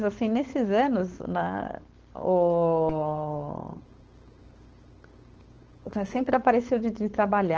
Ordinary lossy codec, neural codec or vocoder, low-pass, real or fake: Opus, 16 kbps; codec, 16 kHz, 4.8 kbps, FACodec; 7.2 kHz; fake